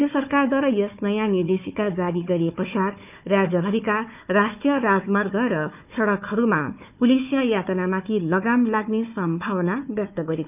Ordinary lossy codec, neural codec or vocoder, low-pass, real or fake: none; codec, 16 kHz, 4 kbps, FunCodec, trained on Chinese and English, 50 frames a second; 3.6 kHz; fake